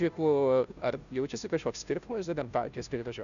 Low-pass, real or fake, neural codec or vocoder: 7.2 kHz; fake; codec, 16 kHz, 0.5 kbps, FunCodec, trained on Chinese and English, 25 frames a second